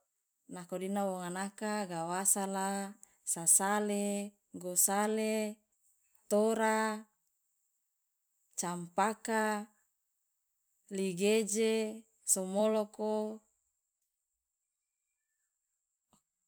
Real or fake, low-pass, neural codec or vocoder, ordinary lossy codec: fake; none; vocoder, 44.1 kHz, 128 mel bands every 256 samples, BigVGAN v2; none